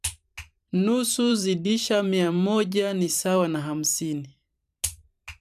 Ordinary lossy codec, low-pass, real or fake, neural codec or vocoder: none; 14.4 kHz; fake; vocoder, 44.1 kHz, 128 mel bands every 512 samples, BigVGAN v2